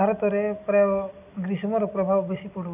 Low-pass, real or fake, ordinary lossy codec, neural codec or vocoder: 3.6 kHz; real; none; none